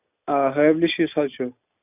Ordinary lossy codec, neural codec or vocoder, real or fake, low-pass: none; none; real; 3.6 kHz